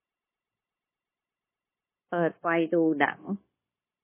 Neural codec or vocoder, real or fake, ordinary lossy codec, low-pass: codec, 16 kHz, 0.9 kbps, LongCat-Audio-Codec; fake; MP3, 16 kbps; 3.6 kHz